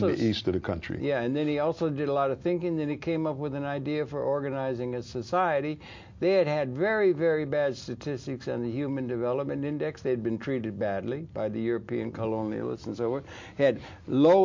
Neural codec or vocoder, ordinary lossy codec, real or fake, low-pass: none; MP3, 48 kbps; real; 7.2 kHz